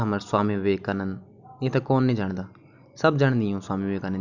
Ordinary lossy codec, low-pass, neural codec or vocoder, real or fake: none; 7.2 kHz; none; real